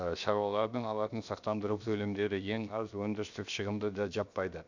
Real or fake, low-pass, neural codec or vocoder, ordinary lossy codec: fake; 7.2 kHz; codec, 16 kHz, 0.7 kbps, FocalCodec; none